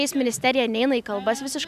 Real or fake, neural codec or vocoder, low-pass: real; none; 14.4 kHz